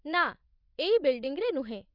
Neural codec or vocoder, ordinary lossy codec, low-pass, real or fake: vocoder, 24 kHz, 100 mel bands, Vocos; none; 5.4 kHz; fake